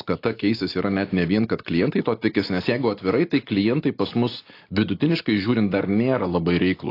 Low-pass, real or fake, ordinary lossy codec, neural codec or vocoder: 5.4 kHz; real; AAC, 32 kbps; none